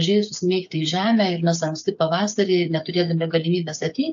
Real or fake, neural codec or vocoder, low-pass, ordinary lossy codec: fake; codec, 16 kHz, 8 kbps, FreqCodec, smaller model; 7.2 kHz; AAC, 64 kbps